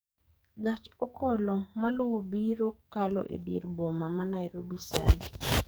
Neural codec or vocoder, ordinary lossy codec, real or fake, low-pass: codec, 44.1 kHz, 2.6 kbps, SNAC; none; fake; none